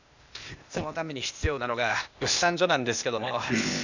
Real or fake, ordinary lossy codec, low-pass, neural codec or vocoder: fake; none; 7.2 kHz; codec, 16 kHz, 0.8 kbps, ZipCodec